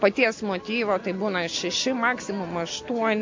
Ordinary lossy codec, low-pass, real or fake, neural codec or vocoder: MP3, 48 kbps; 7.2 kHz; real; none